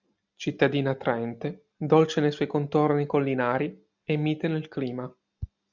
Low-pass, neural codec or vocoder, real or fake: 7.2 kHz; none; real